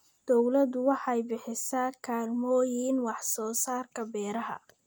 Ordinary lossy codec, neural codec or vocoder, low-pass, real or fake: none; none; none; real